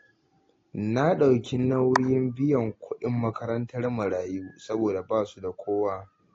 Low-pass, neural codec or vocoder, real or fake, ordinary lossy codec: 7.2 kHz; none; real; AAC, 32 kbps